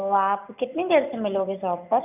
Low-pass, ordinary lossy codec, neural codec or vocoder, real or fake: 3.6 kHz; none; none; real